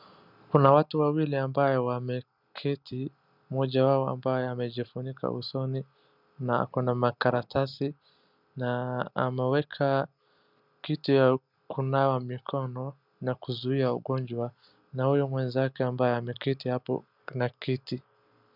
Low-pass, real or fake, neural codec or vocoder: 5.4 kHz; real; none